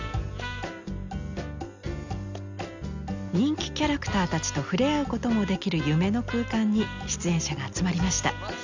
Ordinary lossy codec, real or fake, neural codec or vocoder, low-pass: none; real; none; 7.2 kHz